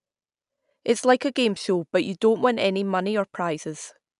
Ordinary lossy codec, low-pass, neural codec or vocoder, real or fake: none; 10.8 kHz; none; real